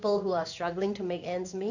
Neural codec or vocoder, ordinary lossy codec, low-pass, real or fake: codec, 16 kHz in and 24 kHz out, 1 kbps, XY-Tokenizer; none; 7.2 kHz; fake